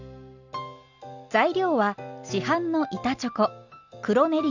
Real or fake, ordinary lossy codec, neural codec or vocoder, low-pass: real; none; none; 7.2 kHz